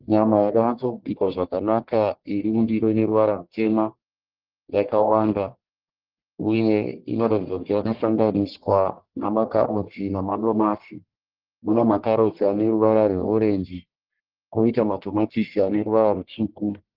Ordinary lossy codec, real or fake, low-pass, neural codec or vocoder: Opus, 16 kbps; fake; 5.4 kHz; codec, 44.1 kHz, 1.7 kbps, Pupu-Codec